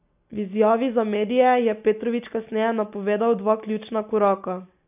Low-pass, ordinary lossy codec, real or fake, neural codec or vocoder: 3.6 kHz; AAC, 32 kbps; real; none